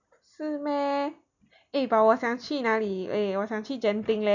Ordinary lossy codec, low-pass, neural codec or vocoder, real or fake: none; 7.2 kHz; none; real